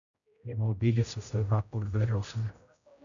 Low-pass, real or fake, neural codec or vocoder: 7.2 kHz; fake; codec, 16 kHz, 0.5 kbps, X-Codec, HuBERT features, trained on general audio